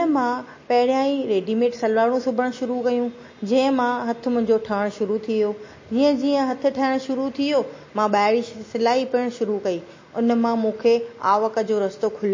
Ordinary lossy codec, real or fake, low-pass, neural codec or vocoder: MP3, 32 kbps; real; 7.2 kHz; none